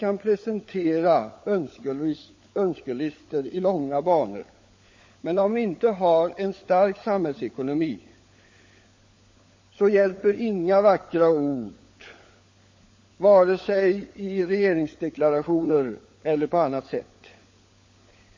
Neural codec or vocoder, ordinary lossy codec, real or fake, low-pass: codec, 16 kHz, 16 kbps, FunCodec, trained on LibriTTS, 50 frames a second; MP3, 32 kbps; fake; 7.2 kHz